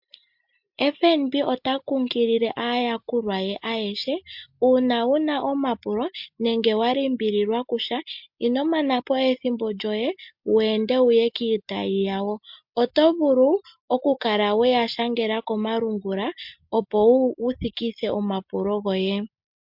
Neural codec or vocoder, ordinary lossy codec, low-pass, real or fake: none; MP3, 48 kbps; 5.4 kHz; real